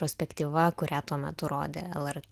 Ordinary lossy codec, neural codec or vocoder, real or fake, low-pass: Opus, 24 kbps; none; real; 14.4 kHz